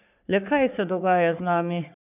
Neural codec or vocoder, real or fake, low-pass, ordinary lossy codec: codec, 44.1 kHz, 3.4 kbps, Pupu-Codec; fake; 3.6 kHz; none